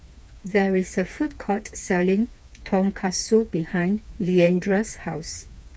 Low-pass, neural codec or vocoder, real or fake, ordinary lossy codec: none; codec, 16 kHz, 4 kbps, FreqCodec, smaller model; fake; none